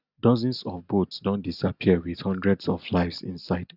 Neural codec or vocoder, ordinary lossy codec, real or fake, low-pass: none; none; real; 5.4 kHz